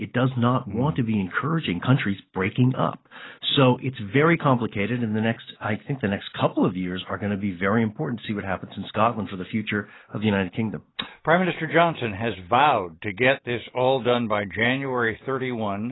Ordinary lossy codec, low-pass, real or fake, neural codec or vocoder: AAC, 16 kbps; 7.2 kHz; real; none